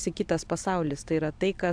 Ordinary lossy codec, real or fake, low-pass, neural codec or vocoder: AAC, 64 kbps; real; 9.9 kHz; none